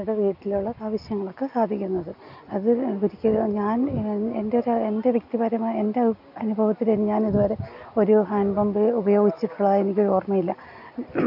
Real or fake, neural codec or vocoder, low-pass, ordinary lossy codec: real; none; 5.4 kHz; none